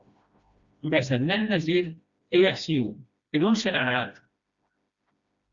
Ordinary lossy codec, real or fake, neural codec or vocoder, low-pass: Opus, 64 kbps; fake; codec, 16 kHz, 1 kbps, FreqCodec, smaller model; 7.2 kHz